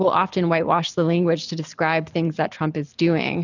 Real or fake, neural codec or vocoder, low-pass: real; none; 7.2 kHz